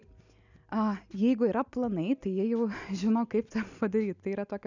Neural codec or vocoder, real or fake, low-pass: none; real; 7.2 kHz